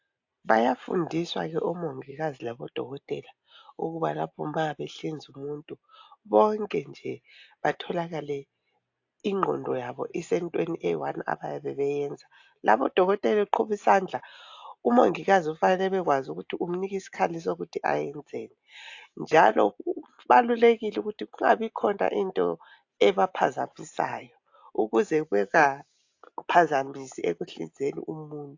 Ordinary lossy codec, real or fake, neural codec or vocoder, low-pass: AAC, 48 kbps; real; none; 7.2 kHz